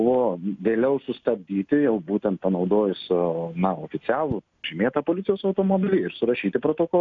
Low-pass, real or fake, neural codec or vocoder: 7.2 kHz; real; none